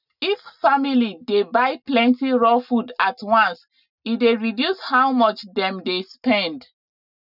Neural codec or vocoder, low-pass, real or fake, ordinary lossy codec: none; 5.4 kHz; real; none